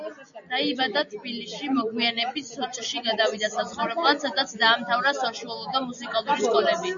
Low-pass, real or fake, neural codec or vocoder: 7.2 kHz; real; none